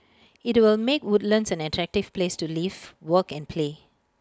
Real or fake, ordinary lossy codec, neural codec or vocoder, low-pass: real; none; none; none